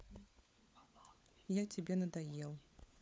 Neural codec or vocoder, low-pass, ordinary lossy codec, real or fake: codec, 16 kHz, 16 kbps, FunCodec, trained on LibriTTS, 50 frames a second; none; none; fake